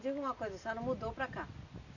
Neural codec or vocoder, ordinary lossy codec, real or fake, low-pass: none; none; real; 7.2 kHz